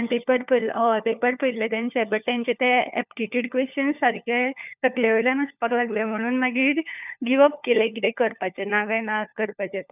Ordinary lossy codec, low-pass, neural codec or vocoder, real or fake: none; 3.6 kHz; codec, 16 kHz, 4 kbps, FunCodec, trained on LibriTTS, 50 frames a second; fake